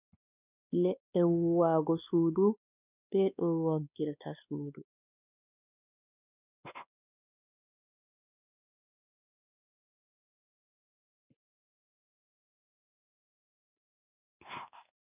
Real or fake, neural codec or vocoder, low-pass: fake; codec, 16 kHz, 2 kbps, X-Codec, WavLM features, trained on Multilingual LibriSpeech; 3.6 kHz